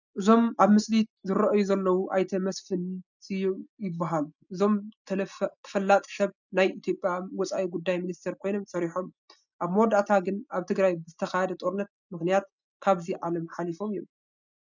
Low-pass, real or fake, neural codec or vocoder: 7.2 kHz; real; none